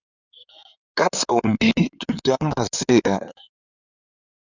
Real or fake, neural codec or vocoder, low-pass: fake; codec, 16 kHz in and 24 kHz out, 2.2 kbps, FireRedTTS-2 codec; 7.2 kHz